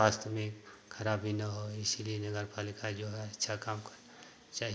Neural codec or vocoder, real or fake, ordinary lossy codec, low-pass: none; real; none; none